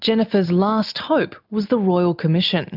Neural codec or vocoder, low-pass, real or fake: none; 5.4 kHz; real